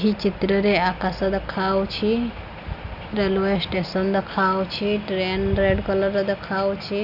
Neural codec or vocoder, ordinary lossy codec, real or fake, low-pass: none; none; real; 5.4 kHz